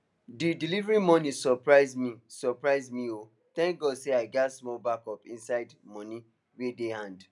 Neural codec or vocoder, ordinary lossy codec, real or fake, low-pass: none; none; real; 10.8 kHz